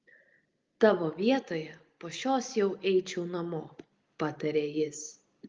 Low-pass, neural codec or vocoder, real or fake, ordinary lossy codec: 7.2 kHz; none; real; Opus, 32 kbps